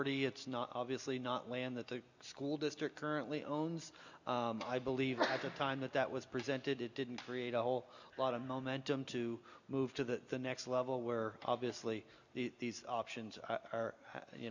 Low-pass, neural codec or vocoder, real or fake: 7.2 kHz; none; real